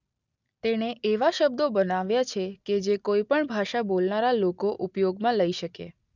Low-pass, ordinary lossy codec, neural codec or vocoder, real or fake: 7.2 kHz; none; none; real